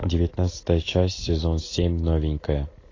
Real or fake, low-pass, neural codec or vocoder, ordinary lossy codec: real; 7.2 kHz; none; AAC, 32 kbps